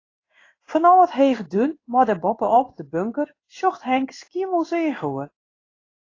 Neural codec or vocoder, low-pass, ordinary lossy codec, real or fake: codec, 16 kHz in and 24 kHz out, 1 kbps, XY-Tokenizer; 7.2 kHz; AAC, 32 kbps; fake